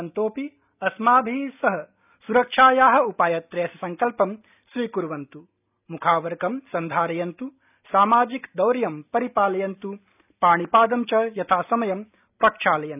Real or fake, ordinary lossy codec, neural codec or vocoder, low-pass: real; none; none; 3.6 kHz